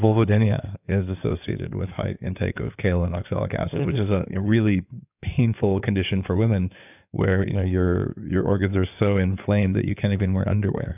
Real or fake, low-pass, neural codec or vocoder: fake; 3.6 kHz; codec, 16 kHz in and 24 kHz out, 2.2 kbps, FireRedTTS-2 codec